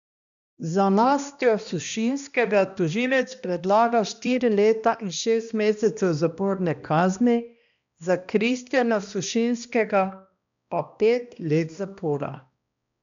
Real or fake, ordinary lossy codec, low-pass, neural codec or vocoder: fake; none; 7.2 kHz; codec, 16 kHz, 1 kbps, X-Codec, HuBERT features, trained on balanced general audio